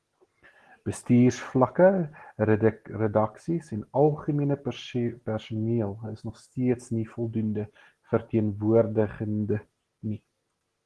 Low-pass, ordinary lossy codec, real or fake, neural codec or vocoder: 10.8 kHz; Opus, 16 kbps; real; none